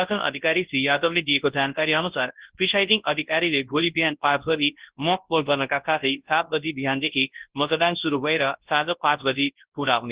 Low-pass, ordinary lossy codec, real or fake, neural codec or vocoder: 3.6 kHz; Opus, 16 kbps; fake; codec, 24 kHz, 0.9 kbps, WavTokenizer, large speech release